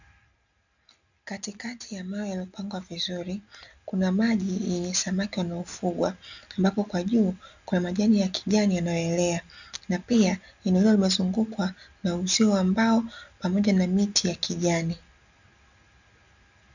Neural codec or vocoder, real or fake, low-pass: none; real; 7.2 kHz